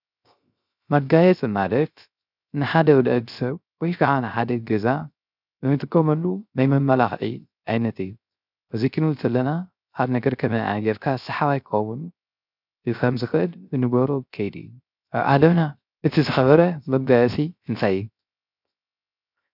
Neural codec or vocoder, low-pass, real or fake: codec, 16 kHz, 0.3 kbps, FocalCodec; 5.4 kHz; fake